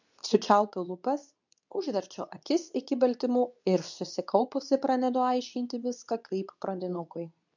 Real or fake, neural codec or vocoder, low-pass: fake; codec, 24 kHz, 0.9 kbps, WavTokenizer, medium speech release version 2; 7.2 kHz